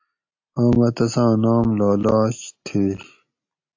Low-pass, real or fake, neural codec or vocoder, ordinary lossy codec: 7.2 kHz; real; none; AAC, 48 kbps